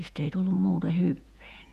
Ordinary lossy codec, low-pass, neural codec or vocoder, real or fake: none; 14.4 kHz; vocoder, 48 kHz, 128 mel bands, Vocos; fake